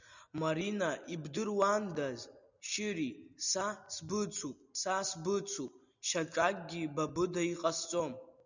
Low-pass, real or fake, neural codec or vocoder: 7.2 kHz; real; none